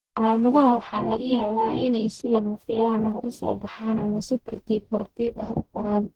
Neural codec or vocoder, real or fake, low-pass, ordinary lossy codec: codec, 44.1 kHz, 0.9 kbps, DAC; fake; 19.8 kHz; Opus, 16 kbps